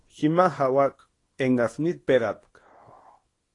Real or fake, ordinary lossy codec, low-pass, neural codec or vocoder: fake; AAC, 32 kbps; 10.8 kHz; codec, 24 kHz, 0.9 kbps, WavTokenizer, small release